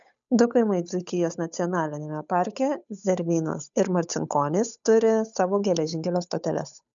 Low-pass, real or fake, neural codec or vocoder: 7.2 kHz; fake; codec, 16 kHz, 8 kbps, FunCodec, trained on Chinese and English, 25 frames a second